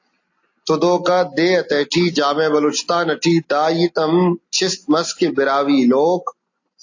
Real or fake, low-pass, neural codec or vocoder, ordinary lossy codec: real; 7.2 kHz; none; AAC, 48 kbps